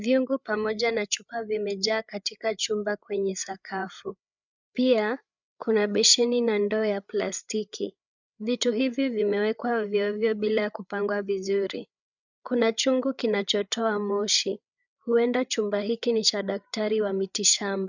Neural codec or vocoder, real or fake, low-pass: codec, 16 kHz, 8 kbps, FreqCodec, larger model; fake; 7.2 kHz